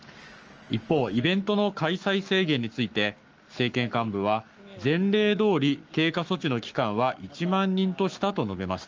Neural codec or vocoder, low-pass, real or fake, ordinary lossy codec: codec, 44.1 kHz, 7.8 kbps, Pupu-Codec; 7.2 kHz; fake; Opus, 24 kbps